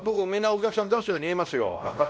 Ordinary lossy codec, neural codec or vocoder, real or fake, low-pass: none; codec, 16 kHz, 1 kbps, X-Codec, WavLM features, trained on Multilingual LibriSpeech; fake; none